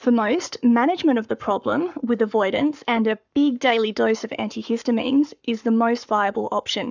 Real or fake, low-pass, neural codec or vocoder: fake; 7.2 kHz; codec, 44.1 kHz, 7.8 kbps, Pupu-Codec